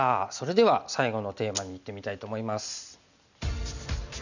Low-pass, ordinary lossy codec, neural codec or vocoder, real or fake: 7.2 kHz; none; none; real